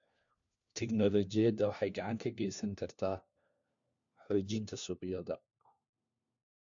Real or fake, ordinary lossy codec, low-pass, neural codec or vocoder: fake; AAC, 64 kbps; 7.2 kHz; codec, 16 kHz, 1 kbps, FunCodec, trained on LibriTTS, 50 frames a second